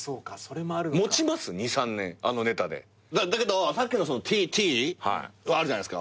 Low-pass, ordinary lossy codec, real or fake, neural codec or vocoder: none; none; real; none